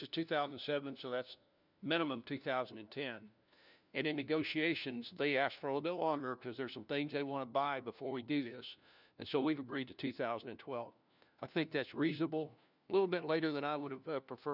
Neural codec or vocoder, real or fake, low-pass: codec, 16 kHz, 1 kbps, FunCodec, trained on LibriTTS, 50 frames a second; fake; 5.4 kHz